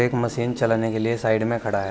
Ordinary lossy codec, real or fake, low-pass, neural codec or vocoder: none; real; none; none